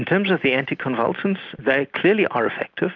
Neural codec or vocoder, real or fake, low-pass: none; real; 7.2 kHz